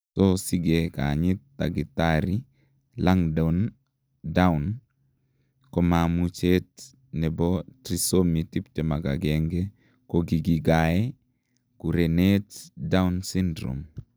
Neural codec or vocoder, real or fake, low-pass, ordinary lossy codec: none; real; none; none